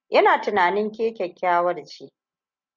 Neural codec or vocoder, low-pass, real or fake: none; 7.2 kHz; real